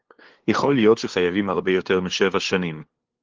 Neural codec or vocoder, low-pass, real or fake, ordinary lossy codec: codec, 16 kHz, 2 kbps, FunCodec, trained on LibriTTS, 25 frames a second; 7.2 kHz; fake; Opus, 16 kbps